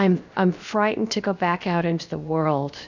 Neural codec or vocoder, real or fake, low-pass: codec, 16 kHz, 0.7 kbps, FocalCodec; fake; 7.2 kHz